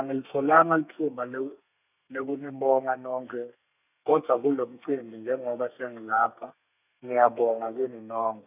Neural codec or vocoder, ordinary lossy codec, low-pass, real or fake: codec, 32 kHz, 1.9 kbps, SNAC; none; 3.6 kHz; fake